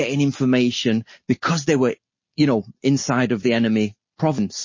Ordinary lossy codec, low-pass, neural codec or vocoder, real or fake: MP3, 32 kbps; 7.2 kHz; none; real